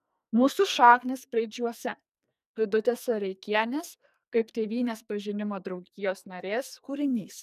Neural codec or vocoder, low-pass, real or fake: codec, 44.1 kHz, 2.6 kbps, SNAC; 14.4 kHz; fake